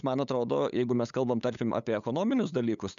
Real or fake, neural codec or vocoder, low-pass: fake; codec, 16 kHz, 8 kbps, FunCodec, trained on LibriTTS, 25 frames a second; 7.2 kHz